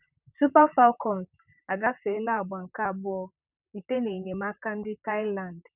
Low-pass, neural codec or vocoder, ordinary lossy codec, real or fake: 3.6 kHz; vocoder, 44.1 kHz, 128 mel bands, Pupu-Vocoder; none; fake